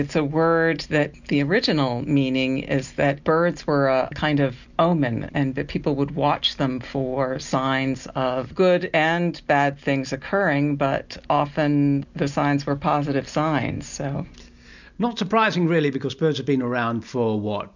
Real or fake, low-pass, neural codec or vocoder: real; 7.2 kHz; none